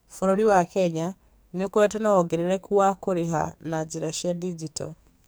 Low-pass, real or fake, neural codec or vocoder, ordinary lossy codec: none; fake; codec, 44.1 kHz, 2.6 kbps, SNAC; none